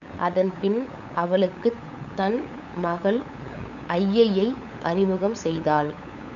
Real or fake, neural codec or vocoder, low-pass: fake; codec, 16 kHz, 8 kbps, FunCodec, trained on LibriTTS, 25 frames a second; 7.2 kHz